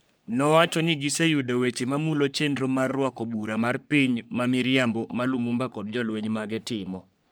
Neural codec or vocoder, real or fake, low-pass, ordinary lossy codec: codec, 44.1 kHz, 3.4 kbps, Pupu-Codec; fake; none; none